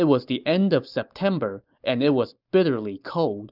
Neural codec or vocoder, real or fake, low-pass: none; real; 5.4 kHz